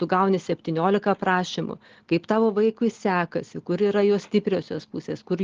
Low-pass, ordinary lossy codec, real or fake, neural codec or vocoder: 7.2 kHz; Opus, 16 kbps; real; none